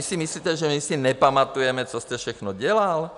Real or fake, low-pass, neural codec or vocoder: real; 10.8 kHz; none